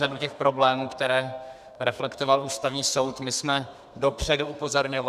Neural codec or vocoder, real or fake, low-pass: codec, 32 kHz, 1.9 kbps, SNAC; fake; 14.4 kHz